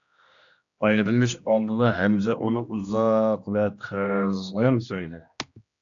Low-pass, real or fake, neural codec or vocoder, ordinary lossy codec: 7.2 kHz; fake; codec, 16 kHz, 1 kbps, X-Codec, HuBERT features, trained on general audio; AAC, 64 kbps